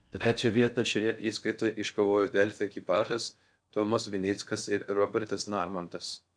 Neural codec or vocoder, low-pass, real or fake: codec, 16 kHz in and 24 kHz out, 0.6 kbps, FocalCodec, streaming, 4096 codes; 9.9 kHz; fake